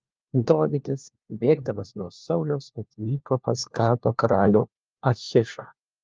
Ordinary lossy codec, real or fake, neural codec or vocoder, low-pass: Opus, 24 kbps; fake; codec, 16 kHz, 1 kbps, FunCodec, trained on LibriTTS, 50 frames a second; 7.2 kHz